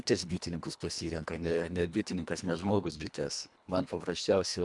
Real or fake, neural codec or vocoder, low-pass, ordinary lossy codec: fake; codec, 24 kHz, 1.5 kbps, HILCodec; 10.8 kHz; MP3, 96 kbps